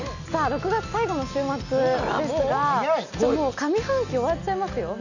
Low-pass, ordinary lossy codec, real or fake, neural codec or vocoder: 7.2 kHz; none; real; none